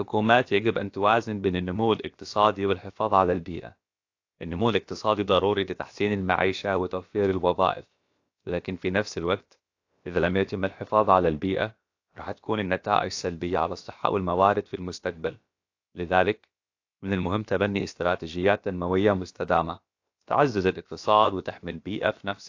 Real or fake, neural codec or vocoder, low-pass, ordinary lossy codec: fake; codec, 16 kHz, about 1 kbps, DyCAST, with the encoder's durations; 7.2 kHz; AAC, 48 kbps